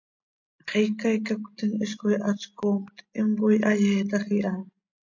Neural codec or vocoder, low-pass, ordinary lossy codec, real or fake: none; 7.2 kHz; MP3, 48 kbps; real